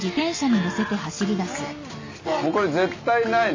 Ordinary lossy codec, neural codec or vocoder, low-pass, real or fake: MP3, 32 kbps; none; 7.2 kHz; real